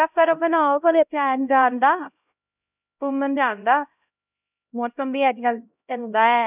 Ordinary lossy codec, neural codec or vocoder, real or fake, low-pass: none; codec, 16 kHz, 0.5 kbps, X-Codec, HuBERT features, trained on LibriSpeech; fake; 3.6 kHz